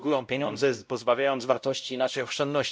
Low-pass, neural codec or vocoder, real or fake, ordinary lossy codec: none; codec, 16 kHz, 0.5 kbps, X-Codec, WavLM features, trained on Multilingual LibriSpeech; fake; none